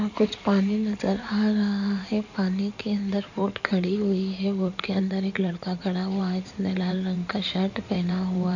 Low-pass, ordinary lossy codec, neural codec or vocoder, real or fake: 7.2 kHz; none; codec, 16 kHz in and 24 kHz out, 2.2 kbps, FireRedTTS-2 codec; fake